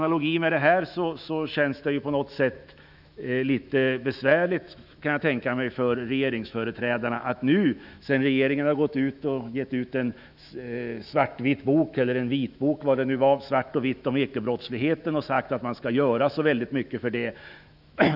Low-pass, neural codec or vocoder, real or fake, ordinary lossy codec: 5.4 kHz; autoencoder, 48 kHz, 128 numbers a frame, DAC-VAE, trained on Japanese speech; fake; none